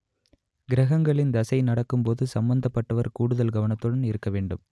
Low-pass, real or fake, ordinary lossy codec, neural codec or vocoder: none; real; none; none